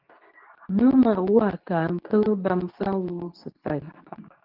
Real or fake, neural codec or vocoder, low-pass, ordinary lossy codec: fake; codec, 24 kHz, 0.9 kbps, WavTokenizer, medium speech release version 2; 5.4 kHz; Opus, 32 kbps